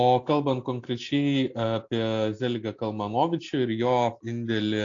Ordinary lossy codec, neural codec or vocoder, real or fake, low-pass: AAC, 48 kbps; none; real; 7.2 kHz